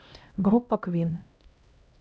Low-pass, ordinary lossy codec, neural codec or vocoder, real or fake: none; none; codec, 16 kHz, 0.5 kbps, X-Codec, HuBERT features, trained on LibriSpeech; fake